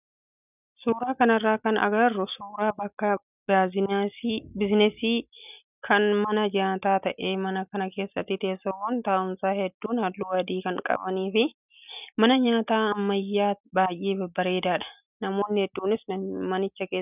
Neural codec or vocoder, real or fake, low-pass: none; real; 3.6 kHz